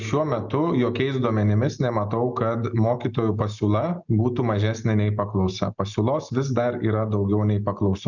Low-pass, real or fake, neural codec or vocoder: 7.2 kHz; real; none